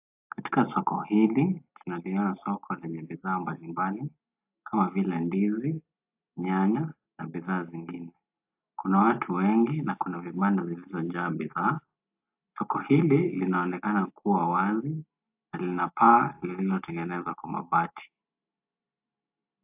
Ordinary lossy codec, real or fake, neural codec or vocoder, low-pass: AAC, 32 kbps; real; none; 3.6 kHz